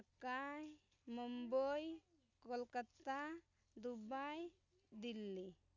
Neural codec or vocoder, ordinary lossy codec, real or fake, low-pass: none; none; real; 7.2 kHz